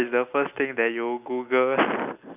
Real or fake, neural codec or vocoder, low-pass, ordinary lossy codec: real; none; 3.6 kHz; none